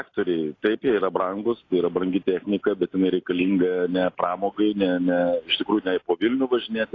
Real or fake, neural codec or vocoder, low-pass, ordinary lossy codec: real; none; 7.2 kHz; AAC, 32 kbps